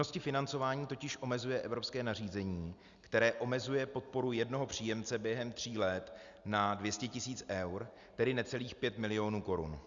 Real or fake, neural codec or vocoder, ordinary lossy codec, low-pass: real; none; Opus, 64 kbps; 7.2 kHz